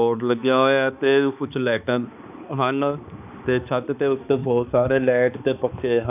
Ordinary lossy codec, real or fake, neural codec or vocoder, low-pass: none; fake; codec, 16 kHz, 2 kbps, X-Codec, HuBERT features, trained on balanced general audio; 3.6 kHz